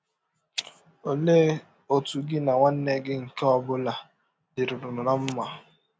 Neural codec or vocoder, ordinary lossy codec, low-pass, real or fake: none; none; none; real